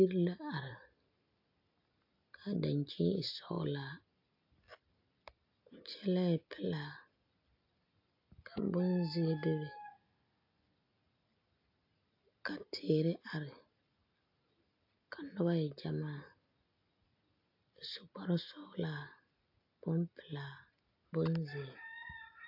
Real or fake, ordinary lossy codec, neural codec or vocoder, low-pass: real; AAC, 48 kbps; none; 5.4 kHz